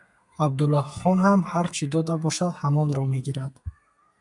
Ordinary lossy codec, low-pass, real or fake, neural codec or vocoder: MP3, 96 kbps; 10.8 kHz; fake; codec, 32 kHz, 1.9 kbps, SNAC